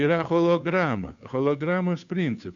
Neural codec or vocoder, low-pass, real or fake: codec, 16 kHz, 2 kbps, FunCodec, trained on Chinese and English, 25 frames a second; 7.2 kHz; fake